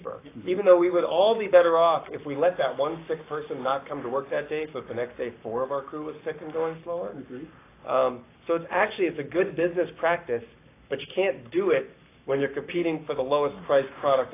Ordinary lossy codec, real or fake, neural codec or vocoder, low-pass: AAC, 24 kbps; fake; codec, 44.1 kHz, 7.8 kbps, Pupu-Codec; 3.6 kHz